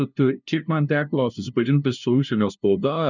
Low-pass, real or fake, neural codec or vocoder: 7.2 kHz; fake; codec, 16 kHz, 0.5 kbps, FunCodec, trained on LibriTTS, 25 frames a second